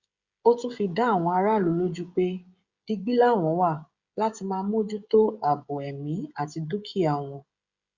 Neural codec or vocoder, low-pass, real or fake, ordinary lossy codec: codec, 16 kHz, 16 kbps, FreqCodec, smaller model; 7.2 kHz; fake; Opus, 64 kbps